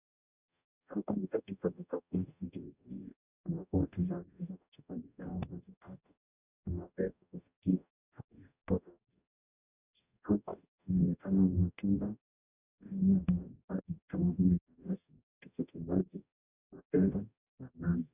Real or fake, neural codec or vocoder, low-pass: fake; codec, 44.1 kHz, 0.9 kbps, DAC; 3.6 kHz